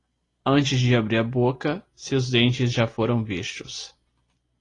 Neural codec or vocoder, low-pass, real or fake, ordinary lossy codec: none; 10.8 kHz; real; AAC, 32 kbps